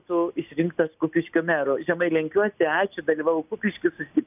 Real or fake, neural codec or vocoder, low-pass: real; none; 3.6 kHz